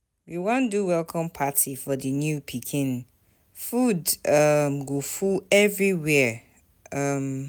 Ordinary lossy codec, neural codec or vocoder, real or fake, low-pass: none; none; real; none